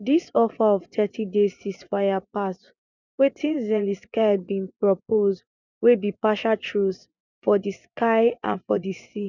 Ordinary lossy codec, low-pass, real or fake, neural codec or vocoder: none; 7.2 kHz; fake; vocoder, 24 kHz, 100 mel bands, Vocos